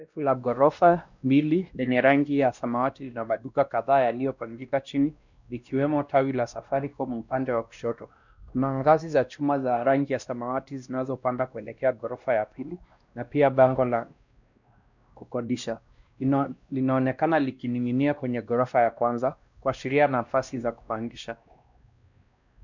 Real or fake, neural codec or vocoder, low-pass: fake; codec, 16 kHz, 1 kbps, X-Codec, WavLM features, trained on Multilingual LibriSpeech; 7.2 kHz